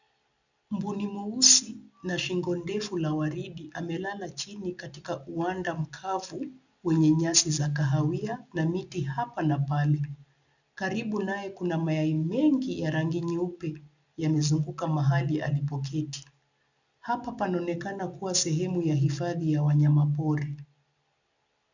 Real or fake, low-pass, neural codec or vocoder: real; 7.2 kHz; none